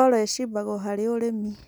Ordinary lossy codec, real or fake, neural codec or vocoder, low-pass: none; real; none; none